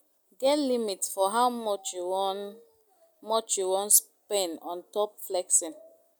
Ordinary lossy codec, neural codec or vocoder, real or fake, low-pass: none; none; real; none